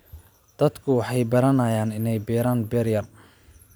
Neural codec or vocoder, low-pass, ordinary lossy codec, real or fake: vocoder, 44.1 kHz, 128 mel bands every 512 samples, BigVGAN v2; none; none; fake